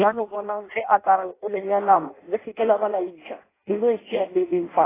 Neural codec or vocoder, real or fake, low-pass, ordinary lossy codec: codec, 16 kHz in and 24 kHz out, 0.6 kbps, FireRedTTS-2 codec; fake; 3.6 kHz; AAC, 16 kbps